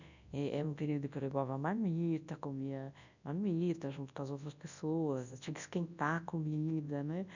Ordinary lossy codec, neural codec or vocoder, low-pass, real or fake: none; codec, 24 kHz, 0.9 kbps, WavTokenizer, large speech release; 7.2 kHz; fake